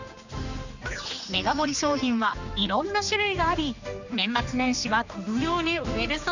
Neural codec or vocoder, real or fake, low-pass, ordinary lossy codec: codec, 16 kHz, 2 kbps, X-Codec, HuBERT features, trained on general audio; fake; 7.2 kHz; none